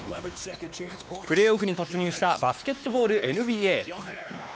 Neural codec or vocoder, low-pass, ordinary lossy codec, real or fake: codec, 16 kHz, 2 kbps, X-Codec, WavLM features, trained on Multilingual LibriSpeech; none; none; fake